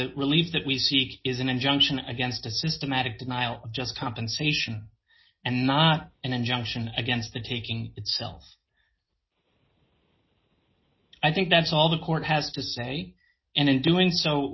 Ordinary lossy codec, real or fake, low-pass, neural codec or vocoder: MP3, 24 kbps; real; 7.2 kHz; none